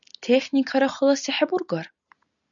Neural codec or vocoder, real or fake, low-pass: none; real; 7.2 kHz